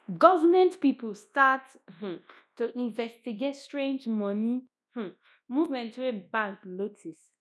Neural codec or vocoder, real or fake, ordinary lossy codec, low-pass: codec, 24 kHz, 0.9 kbps, WavTokenizer, large speech release; fake; none; none